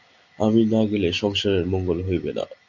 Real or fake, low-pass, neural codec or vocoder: real; 7.2 kHz; none